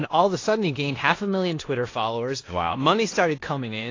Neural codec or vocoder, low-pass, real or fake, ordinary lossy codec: codec, 16 kHz in and 24 kHz out, 0.9 kbps, LongCat-Audio-Codec, four codebook decoder; 7.2 kHz; fake; AAC, 32 kbps